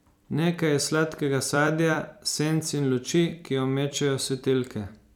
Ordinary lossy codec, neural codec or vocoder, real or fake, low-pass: none; vocoder, 44.1 kHz, 128 mel bands every 256 samples, BigVGAN v2; fake; 19.8 kHz